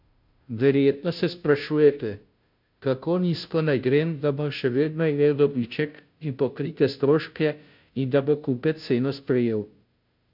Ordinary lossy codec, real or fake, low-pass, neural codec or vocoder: MP3, 48 kbps; fake; 5.4 kHz; codec, 16 kHz, 0.5 kbps, FunCodec, trained on Chinese and English, 25 frames a second